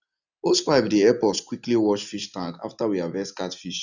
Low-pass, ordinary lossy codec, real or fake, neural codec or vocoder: 7.2 kHz; none; real; none